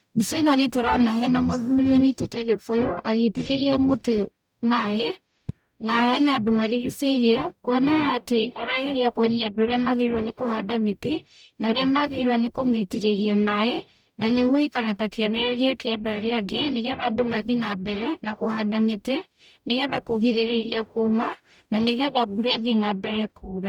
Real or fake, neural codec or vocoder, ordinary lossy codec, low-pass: fake; codec, 44.1 kHz, 0.9 kbps, DAC; none; 19.8 kHz